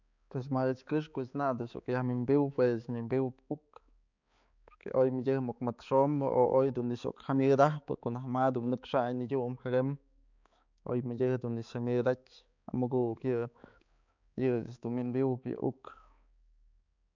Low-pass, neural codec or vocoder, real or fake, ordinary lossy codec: 7.2 kHz; codec, 16 kHz, 4 kbps, X-Codec, HuBERT features, trained on balanced general audio; fake; none